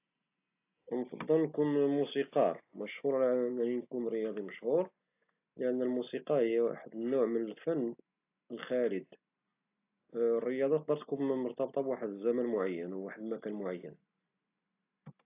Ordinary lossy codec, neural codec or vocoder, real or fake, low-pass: AAC, 32 kbps; none; real; 3.6 kHz